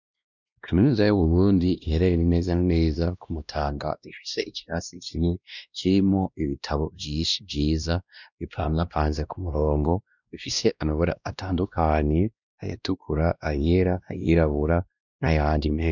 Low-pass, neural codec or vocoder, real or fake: 7.2 kHz; codec, 16 kHz, 1 kbps, X-Codec, WavLM features, trained on Multilingual LibriSpeech; fake